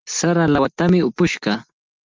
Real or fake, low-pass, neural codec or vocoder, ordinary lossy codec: real; 7.2 kHz; none; Opus, 32 kbps